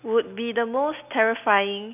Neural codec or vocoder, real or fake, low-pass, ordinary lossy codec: none; real; 3.6 kHz; none